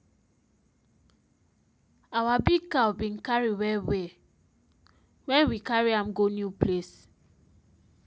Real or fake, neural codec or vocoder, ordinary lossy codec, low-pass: real; none; none; none